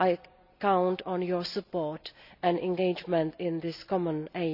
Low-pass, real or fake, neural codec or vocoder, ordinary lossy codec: 5.4 kHz; real; none; none